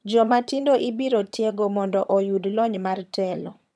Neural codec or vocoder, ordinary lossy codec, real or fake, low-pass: vocoder, 22.05 kHz, 80 mel bands, HiFi-GAN; none; fake; none